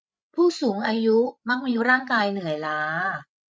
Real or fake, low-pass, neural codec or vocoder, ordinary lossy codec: fake; none; codec, 16 kHz, 8 kbps, FreqCodec, larger model; none